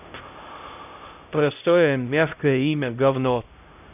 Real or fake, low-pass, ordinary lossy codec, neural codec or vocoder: fake; 3.6 kHz; none; codec, 16 kHz, 0.5 kbps, X-Codec, HuBERT features, trained on LibriSpeech